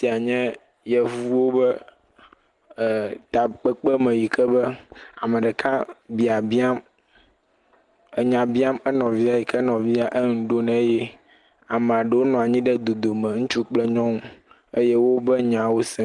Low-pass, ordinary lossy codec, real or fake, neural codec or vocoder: 10.8 kHz; Opus, 24 kbps; real; none